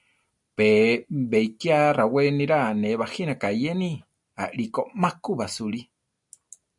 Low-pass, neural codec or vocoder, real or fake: 10.8 kHz; none; real